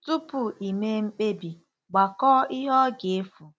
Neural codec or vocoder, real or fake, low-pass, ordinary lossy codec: none; real; none; none